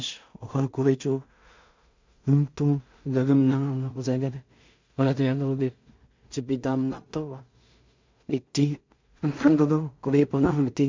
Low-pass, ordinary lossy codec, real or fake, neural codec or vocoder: 7.2 kHz; MP3, 64 kbps; fake; codec, 16 kHz in and 24 kHz out, 0.4 kbps, LongCat-Audio-Codec, two codebook decoder